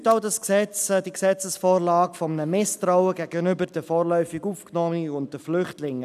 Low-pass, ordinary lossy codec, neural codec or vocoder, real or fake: 14.4 kHz; none; none; real